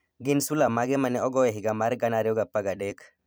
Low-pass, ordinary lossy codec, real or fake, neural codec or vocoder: none; none; fake; vocoder, 44.1 kHz, 128 mel bands every 256 samples, BigVGAN v2